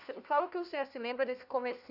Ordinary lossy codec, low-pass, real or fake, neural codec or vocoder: none; 5.4 kHz; fake; codec, 16 kHz, 1 kbps, FunCodec, trained on LibriTTS, 50 frames a second